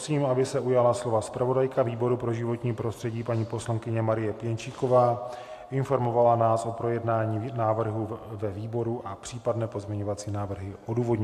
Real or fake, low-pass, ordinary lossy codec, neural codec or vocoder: real; 14.4 kHz; AAC, 64 kbps; none